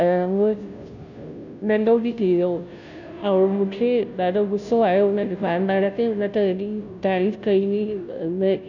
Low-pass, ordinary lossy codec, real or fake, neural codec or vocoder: 7.2 kHz; none; fake; codec, 16 kHz, 0.5 kbps, FunCodec, trained on Chinese and English, 25 frames a second